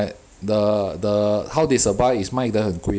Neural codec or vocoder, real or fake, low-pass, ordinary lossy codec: none; real; none; none